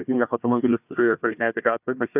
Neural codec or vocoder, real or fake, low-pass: codec, 16 kHz, 1 kbps, FunCodec, trained on Chinese and English, 50 frames a second; fake; 3.6 kHz